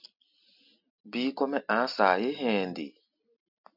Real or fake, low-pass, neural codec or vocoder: real; 5.4 kHz; none